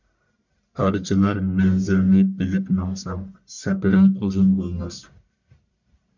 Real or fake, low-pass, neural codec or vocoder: fake; 7.2 kHz; codec, 44.1 kHz, 1.7 kbps, Pupu-Codec